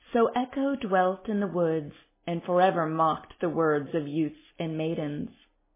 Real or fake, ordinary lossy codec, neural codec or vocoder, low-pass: real; MP3, 16 kbps; none; 3.6 kHz